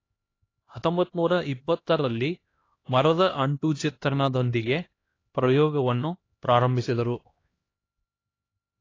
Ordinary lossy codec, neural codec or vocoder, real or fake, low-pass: AAC, 32 kbps; codec, 16 kHz, 1 kbps, X-Codec, HuBERT features, trained on LibriSpeech; fake; 7.2 kHz